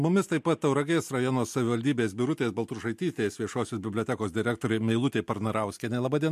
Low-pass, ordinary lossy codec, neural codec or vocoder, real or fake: 14.4 kHz; MP3, 64 kbps; none; real